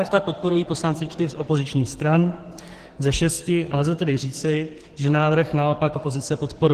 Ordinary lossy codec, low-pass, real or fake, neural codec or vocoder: Opus, 16 kbps; 14.4 kHz; fake; codec, 44.1 kHz, 2.6 kbps, SNAC